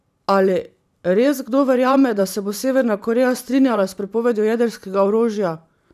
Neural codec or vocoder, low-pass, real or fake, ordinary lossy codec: vocoder, 44.1 kHz, 128 mel bands every 512 samples, BigVGAN v2; 14.4 kHz; fake; none